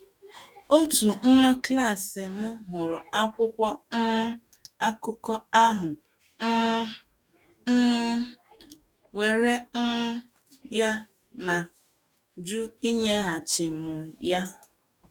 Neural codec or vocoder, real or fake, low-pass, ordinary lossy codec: codec, 44.1 kHz, 2.6 kbps, DAC; fake; 19.8 kHz; none